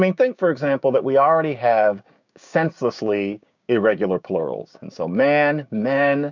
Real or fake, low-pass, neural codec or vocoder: fake; 7.2 kHz; codec, 16 kHz, 6 kbps, DAC